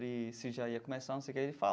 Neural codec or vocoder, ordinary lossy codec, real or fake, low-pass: none; none; real; none